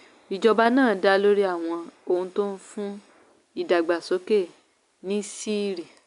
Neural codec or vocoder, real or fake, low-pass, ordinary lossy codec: none; real; 10.8 kHz; none